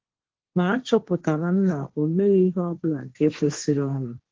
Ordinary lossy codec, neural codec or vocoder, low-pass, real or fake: Opus, 16 kbps; codec, 16 kHz, 1.1 kbps, Voila-Tokenizer; 7.2 kHz; fake